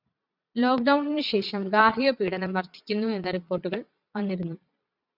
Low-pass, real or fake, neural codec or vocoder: 5.4 kHz; fake; vocoder, 22.05 kHz, 80 mel bands, Vocos